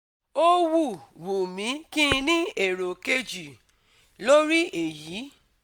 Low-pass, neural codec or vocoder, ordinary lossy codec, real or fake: none; none; none; real